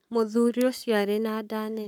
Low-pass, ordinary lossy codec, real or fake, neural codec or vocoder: 19.8 kHz; none; fake; codec, 44.1 kHz, 7.8 kbps, Pupu-Codec